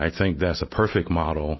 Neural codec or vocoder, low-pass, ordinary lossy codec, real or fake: none; 7.2 kHz; MP3, 24 kbps; real